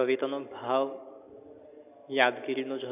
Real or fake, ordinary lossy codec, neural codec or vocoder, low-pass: real; none; none; 3.6 kHz